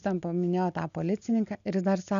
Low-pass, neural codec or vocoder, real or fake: 7.2 kHz; none; real